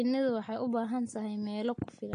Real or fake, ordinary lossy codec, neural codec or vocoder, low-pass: real; AAC, 48 kbps; none; 9.9 kHz